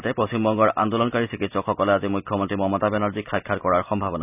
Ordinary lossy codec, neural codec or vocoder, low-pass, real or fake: none; none; 3.6 kHz; real